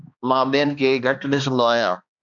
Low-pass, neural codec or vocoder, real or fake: 7.2 kHz; codec, 16 kHz, 2 kbps, X-Codec, HuBERT features, trained on balanced general audio; fake